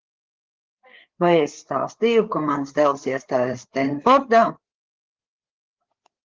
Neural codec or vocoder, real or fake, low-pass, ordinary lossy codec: codec, 16 kHz, 8 kbps, FreqCodec, larger model; fake; 7.2 kHz; Opus, 16 kbps